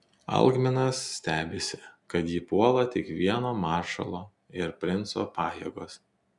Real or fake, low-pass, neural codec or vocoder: real; 10.8 kHz; none